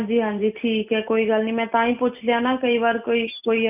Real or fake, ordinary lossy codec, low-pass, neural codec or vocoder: real; none; 3.6 kHz; none